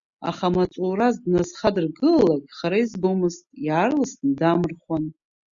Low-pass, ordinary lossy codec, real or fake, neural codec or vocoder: 7.2 kHz; Opus, 64 kbps; real; none